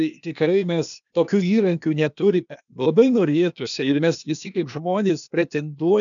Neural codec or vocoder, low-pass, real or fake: codec, 16 kHz, 0.8 kbps, ZipCodec; 7.2 kHz; fake